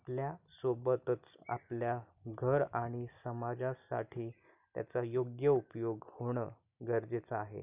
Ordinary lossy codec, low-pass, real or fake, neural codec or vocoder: none; 3.6 kHz; real; none